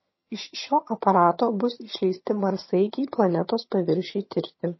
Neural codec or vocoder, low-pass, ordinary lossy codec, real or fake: vocoder, 22.05 kHz, 80 mel bands, HiFi-GAN; 7.2 kHz; MP3, 24 kbps; fake